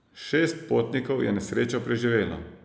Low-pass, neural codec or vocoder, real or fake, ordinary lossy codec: none; none; real; none